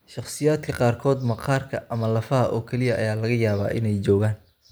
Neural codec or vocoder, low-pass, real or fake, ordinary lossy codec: none; none; real; none